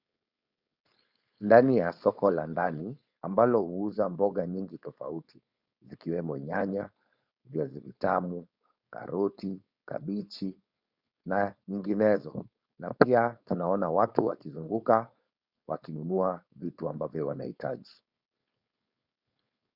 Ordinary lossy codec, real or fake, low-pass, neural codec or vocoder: Opus, 64 kbps; fake; 5.4 kHz; codec, 16 kHz, 4.8 kbps, FACodec